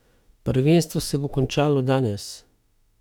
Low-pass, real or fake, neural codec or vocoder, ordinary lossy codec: 19.8 kHz; fake; autoencoder, 48 kHz, 32 numbers a frame, DAC-VAE, trained on Japanese speech; none